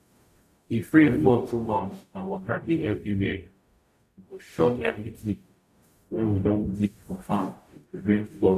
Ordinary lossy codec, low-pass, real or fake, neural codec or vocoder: none; 14.4 kHz; fake; codec, 44.1 kHz, 0.9 kbps, DAC